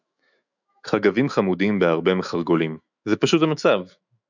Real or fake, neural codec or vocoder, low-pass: fake; autoencoder, 48 kHz, 128 numbers a frame, DAC-VAE, trained on Japanese speech; 7.2 kHz